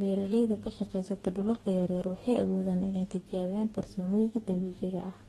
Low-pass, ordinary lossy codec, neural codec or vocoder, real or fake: 19.8 kHz; AAC, 32 kbps; codec, 44.1 kHz, 2.6 kbps, DAC; fake